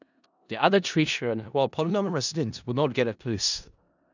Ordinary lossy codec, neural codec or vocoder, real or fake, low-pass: none; codec, 16 kHz in and 24 kHz out, 0.4 kbps, LongCat-Audio-Codec, four codebook decoder; fake; 7.2 kHz